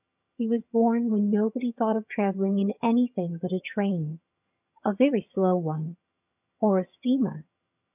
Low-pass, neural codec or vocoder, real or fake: 3.6 kHz; vocoder, 22.05 kHz, 80 mel bands, HiFi-GAN; fake